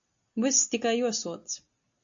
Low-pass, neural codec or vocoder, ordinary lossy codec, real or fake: 7.2 kHz; none; AAC, 64 kbps; real